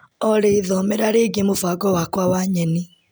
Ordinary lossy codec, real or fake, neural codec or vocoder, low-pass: none; real; none; none